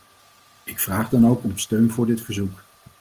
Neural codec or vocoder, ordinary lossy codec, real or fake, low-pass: none; Opus, 24 kbps; real; 14.4 kHz